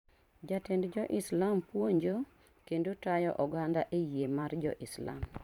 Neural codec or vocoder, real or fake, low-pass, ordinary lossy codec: vocoder, 44.1 kHz, 128 mel bands every 512 samples, BigVGAN v2; fake; 19.8 kHz; none